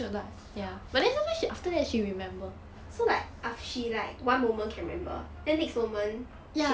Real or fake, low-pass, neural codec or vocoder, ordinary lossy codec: real; none; none; none